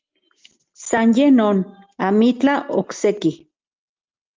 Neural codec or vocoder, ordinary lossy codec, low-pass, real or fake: none; Opus, 32 kbps; 7.2 kHz; real